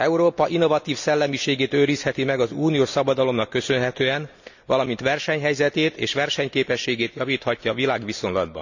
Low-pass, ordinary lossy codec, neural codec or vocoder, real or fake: 7.2 kHz; none; none; real